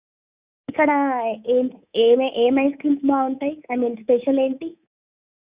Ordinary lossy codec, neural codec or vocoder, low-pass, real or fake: Opus, 64 kbps; codec, 24 kHz, 3.1 kbps, DualCodec; 3.6 kHz; fake